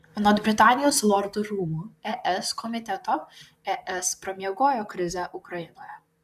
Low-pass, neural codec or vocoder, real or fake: 14.4 kHz; vocoder, 44.1 kHz, 128 mel bands, Pupu-Vocoder; fake